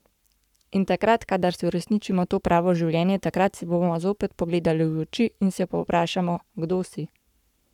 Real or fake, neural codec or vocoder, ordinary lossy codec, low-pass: fake; codec, 44.1 kHz, 7.8 kbps, Pupu-Codec; none; 19.8 kHz